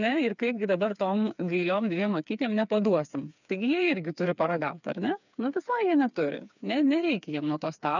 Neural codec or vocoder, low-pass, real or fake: codec, 16 kHz, 4 kbps, FreqCodec, smaller model; 7.2 kHz; fake